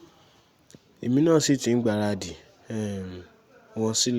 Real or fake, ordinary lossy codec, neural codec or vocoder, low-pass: real; Opus, 64 kbps; none; 19.8 kHz